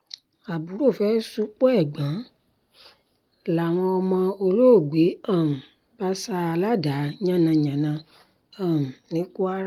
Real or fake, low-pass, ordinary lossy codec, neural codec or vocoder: real; 19.8 kHz; Opus, 32 kbps; none